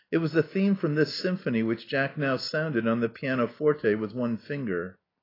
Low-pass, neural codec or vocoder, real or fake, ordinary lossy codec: 5.4 kHz; none; real; AAC, 24 kbps